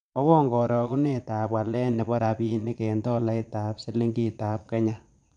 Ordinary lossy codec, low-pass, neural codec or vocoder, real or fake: none; 9.9 kHz; vocoder, 22.05 kHz, 80 mel bands, Vocos; fake